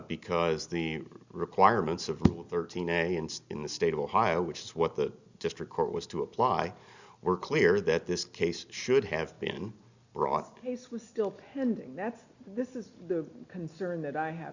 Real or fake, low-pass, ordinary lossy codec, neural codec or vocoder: real; 7.2 kHz; Opus, 64 kbps; none